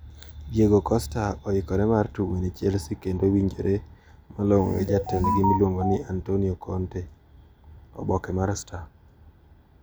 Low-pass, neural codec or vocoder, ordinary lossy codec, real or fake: none; none; none; real